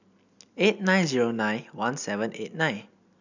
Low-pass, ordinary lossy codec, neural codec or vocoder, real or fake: 7.2 kHz; none; none; real